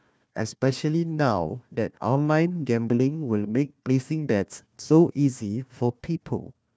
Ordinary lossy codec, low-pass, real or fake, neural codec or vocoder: none; none; fake; codec, 16 kHz, 1 kbps, FunCodec, trained on Chinese and English, 50 frames a second